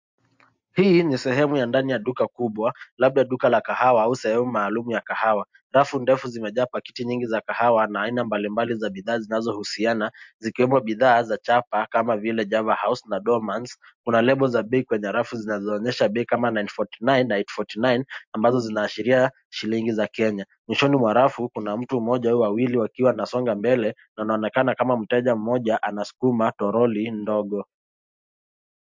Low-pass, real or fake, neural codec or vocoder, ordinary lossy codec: 7.2 kHz; real; none; MP3, 64 kbps